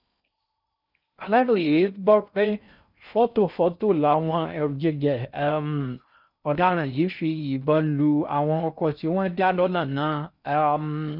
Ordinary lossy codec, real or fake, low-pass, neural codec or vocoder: none; fake; 5.4 kHz; codec, 16 kHz in and 24 kHz out, 0.6 kbps, FocalCodec, streaming, 4096 codes